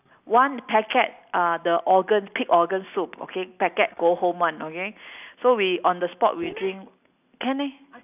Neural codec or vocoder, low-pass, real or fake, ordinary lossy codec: none; 3.6 kHz; real; none